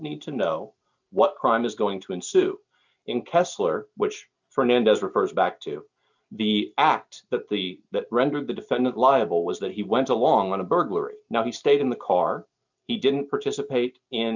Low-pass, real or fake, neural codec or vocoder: 7.2 kHz; real; none